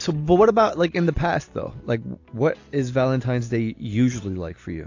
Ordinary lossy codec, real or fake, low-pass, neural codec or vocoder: AAC, 48 kbps; real; 7.2 kHz; none